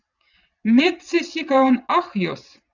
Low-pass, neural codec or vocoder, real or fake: 7.2 kHz; vocoder, 22.05 kHz, 80 mel bands, WaveNeXt; fake